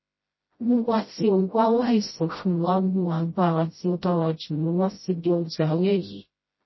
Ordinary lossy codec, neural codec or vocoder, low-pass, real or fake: MP3, 24 kbps; codec, 16 kHz, 0.5 kbps, FreqCodec, smaller model; 7.2 kHz; fake